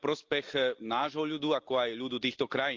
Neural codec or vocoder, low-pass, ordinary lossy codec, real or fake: none; 7.2 kHz; Opus, 32 kbps; real